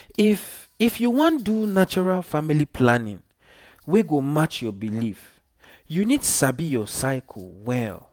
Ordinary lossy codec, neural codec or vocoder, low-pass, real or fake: none; vocoder, 48 kHz, 128 mel bands, Vocos; none; fake